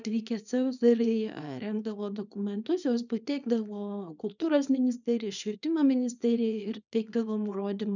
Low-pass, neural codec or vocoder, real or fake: 7.2 kHz; codec, 24 kHz, 0.9 kbps, WavTokenizer, small release; fake